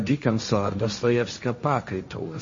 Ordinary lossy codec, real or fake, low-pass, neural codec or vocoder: MP3, 32 kbps; fake; 7.2 kHz; codec, 16 kHz, 1.1 kbps, Voila-Tokenizer